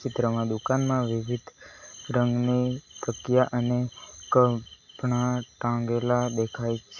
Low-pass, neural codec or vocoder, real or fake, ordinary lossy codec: 7.2 kHz; none; real; Opus, 64 kbps